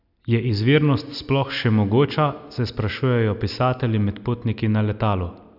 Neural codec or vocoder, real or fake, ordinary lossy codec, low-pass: none; real; none; 5.4 kHz